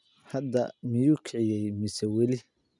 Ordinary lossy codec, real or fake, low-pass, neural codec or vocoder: none; real; 10.8 kHz; none